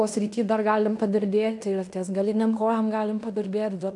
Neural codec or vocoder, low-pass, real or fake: codec, 16 kHz in and 24 kHz out, 0.9 kbps, LongCat-Audio-Codec, fine tuned four codebook decoder; 10.8 kHz; fake